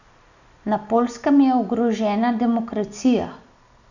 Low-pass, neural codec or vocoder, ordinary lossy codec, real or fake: 7.2 kHz; none; none; real